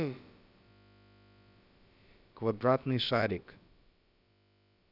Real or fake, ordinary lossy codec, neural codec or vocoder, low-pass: fake; none; codec, 16 kHz, about 1 kbps, DyCAST, with the encoder's durations; 5.4 kHz